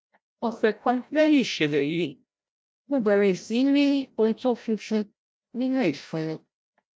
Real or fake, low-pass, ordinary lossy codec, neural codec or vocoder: fake; none; none; codec, 16 kHz, 0.5 kbps, FreqCodec, larger model